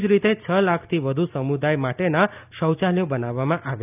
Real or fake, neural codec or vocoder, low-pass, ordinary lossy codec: real; none; 3.6 kHz; none